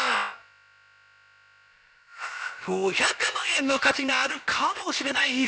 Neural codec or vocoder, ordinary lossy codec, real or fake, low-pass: codec, 16 kHz, about 1 kbps, DyCAST, with the encoder's durations; none; fake; none